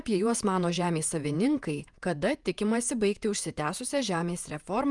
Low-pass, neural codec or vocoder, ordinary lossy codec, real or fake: 10.8 kHz; vocoder, 48 kHz, 128 mel bands, Vocos; Opus, 32 kbps; fake